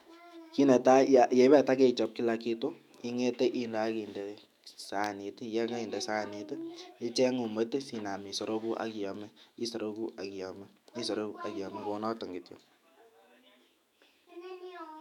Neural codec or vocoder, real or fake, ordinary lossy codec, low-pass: autoencoder, 48 kHz, 128 numbers a frame, DAC-VAE, trained on Japanese speech; fake; none; 19.8 kHz